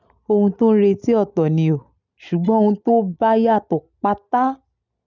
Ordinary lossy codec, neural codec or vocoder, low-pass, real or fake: none; none; 7.2 kHz; real